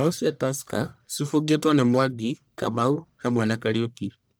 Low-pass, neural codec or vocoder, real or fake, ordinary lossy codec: none; codec, 44.1 kHz, 1.7 kbps, Pupu-Codec; fake; none